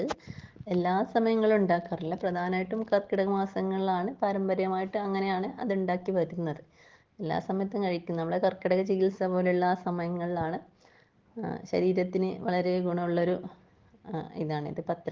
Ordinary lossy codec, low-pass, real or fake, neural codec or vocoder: Opus, 16 kbps; 7.2 kHz; real; none